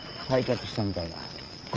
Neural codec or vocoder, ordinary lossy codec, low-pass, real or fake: vocoder, 22.05 kHz, 80 mel bands, Vocos; Opus, 24 kbps; 7.2 kHz; fake